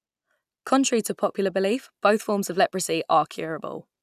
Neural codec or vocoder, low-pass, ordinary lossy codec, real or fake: none; 14.4 kHz; none; real